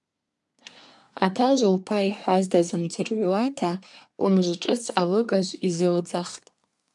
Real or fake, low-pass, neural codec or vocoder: fake; 10.8 kHz; codec, 24 kHz, 1 kbps, SNAC